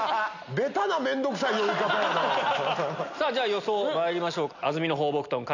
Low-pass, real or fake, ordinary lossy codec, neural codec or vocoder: 7.2 kHz; real; none; none